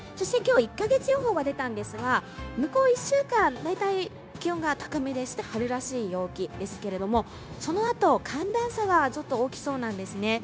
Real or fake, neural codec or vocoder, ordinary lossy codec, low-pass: fake; codec, 16 kHz, 0.9 kbps, LongCat-Audio-Codec; none; none